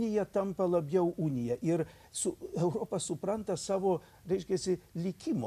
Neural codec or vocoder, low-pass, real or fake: none; 14.4 kHz; real